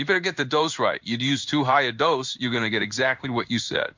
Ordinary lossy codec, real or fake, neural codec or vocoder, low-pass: MP3, 64 kbps; fake; codec, 16 kHz in and 24 kHz out, 1 kbps, XY-Tokenizer; 7.2 kHz